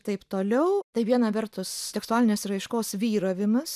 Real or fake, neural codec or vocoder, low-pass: real; none; 14.4 kHz